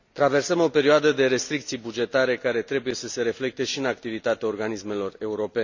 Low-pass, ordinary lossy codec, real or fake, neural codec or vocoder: 7.2 kHz; none; real; none